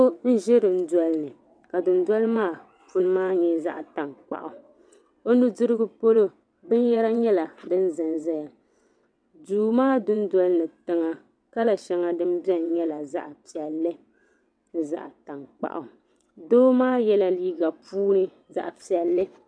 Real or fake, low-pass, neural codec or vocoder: fake; 9.9 kHz; vocoder, 22.05 kHz, 80 mel bands, WaveNeXt